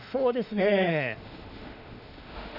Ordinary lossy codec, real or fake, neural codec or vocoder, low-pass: none; fake; autoencoder, 48 kHz, 32 numbers a frame, DAC-VAE, trained on Japanese speech; 5.4 kHz